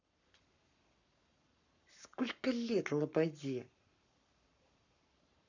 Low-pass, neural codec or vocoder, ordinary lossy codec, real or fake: 7.2 kHz; codec, 44.1 kHz, 7.8 kbps, Pupu-Codec; none; fake